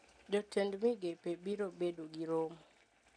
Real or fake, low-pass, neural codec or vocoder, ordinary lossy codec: real; 9.9 kHz; none; none